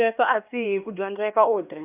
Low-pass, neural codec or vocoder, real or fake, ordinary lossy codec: 3.6 kHz; codec, 16 kHz, 2 kbps, X-Codec, HuBERT features, trained on LibriSpeech; fake; none